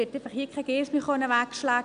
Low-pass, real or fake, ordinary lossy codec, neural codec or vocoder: 9.9 kHz; real; none; none